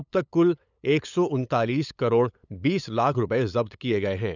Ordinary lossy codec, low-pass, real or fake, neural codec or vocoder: none; 7.2 kHz; fake; codec, 16 kHz, 8 kbps, FunCodec, trained on LibriTTS, 25 frames a second